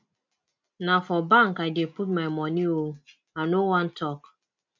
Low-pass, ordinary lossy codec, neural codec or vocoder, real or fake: 7.2 kHz; MP3, 64 kbps; none; real